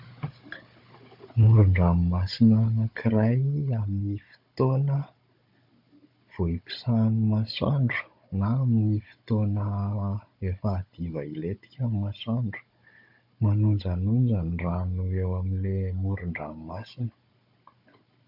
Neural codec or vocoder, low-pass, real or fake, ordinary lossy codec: codec, 16 kHz, 16 kbps, FunCodec, trained on Chinese and English, 50 frames a second; 5.4 kHz; fake; MP3, 48 kbps